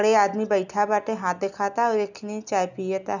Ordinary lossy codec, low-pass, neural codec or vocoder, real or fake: none; 7.2 kHz; none; real